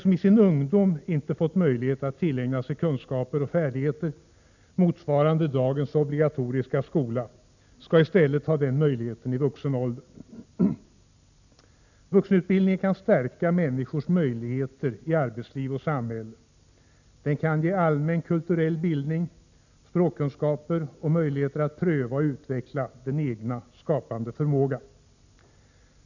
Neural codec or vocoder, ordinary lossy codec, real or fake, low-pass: none; none; real; 7.2 kHz